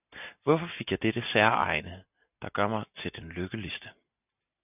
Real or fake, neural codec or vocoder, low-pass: real; none; 3.6 kHz